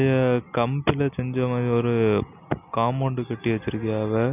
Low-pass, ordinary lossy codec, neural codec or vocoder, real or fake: 3.6 kHz; none; none; real